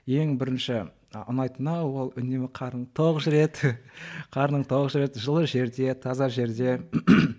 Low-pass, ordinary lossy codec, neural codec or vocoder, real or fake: none; none; none; real